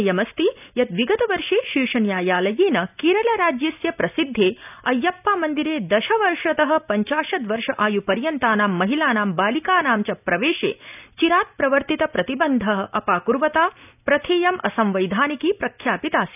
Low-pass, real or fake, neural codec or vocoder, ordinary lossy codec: 3.6 kHz; real; none; none